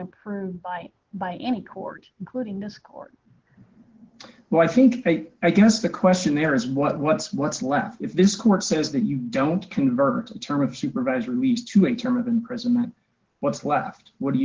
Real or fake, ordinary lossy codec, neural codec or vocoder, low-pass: real; Opus, 16 kbps; none; 7.2 kHz